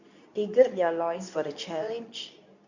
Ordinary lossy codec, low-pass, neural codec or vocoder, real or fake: none; 7.2 kHz; codec, 24 kHz, 0.9 kbps, WavTokenizer, medium speech release version 2; fake